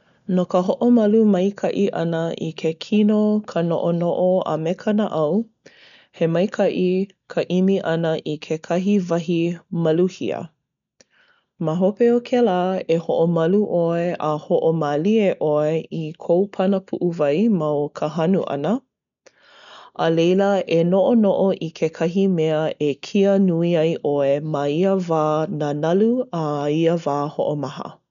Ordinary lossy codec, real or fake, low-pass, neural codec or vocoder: none; real; 7.2 kHz; none